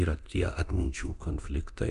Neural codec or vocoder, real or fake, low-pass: codec, 24 kHz, 0.9 kbps, DualCodec; fake; 10.8 kHz